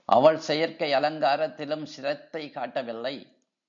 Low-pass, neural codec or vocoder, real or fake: 7.2 kHz; none; real